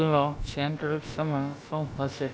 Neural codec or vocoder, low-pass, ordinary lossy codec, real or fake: codec, 16 kHz, about 1 kbps, DyCAST, with the encoder's durations; none; none; fake